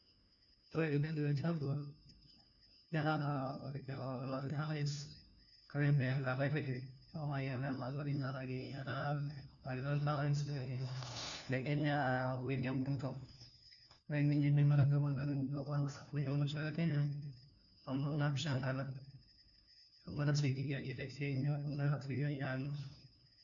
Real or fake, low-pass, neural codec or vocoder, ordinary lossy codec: fake; 7.2 kHz; codec, 16 kHz, 1 kbps, FunCodec, trained on LibriTTS, 50 frames a second; none